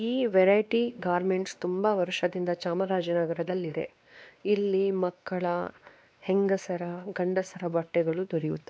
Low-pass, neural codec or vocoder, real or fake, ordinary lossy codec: none; codec, 16 kHz, 2 kbps, X-Codec, WavLM features, trained on Multilingual LibriSpeech; fake; none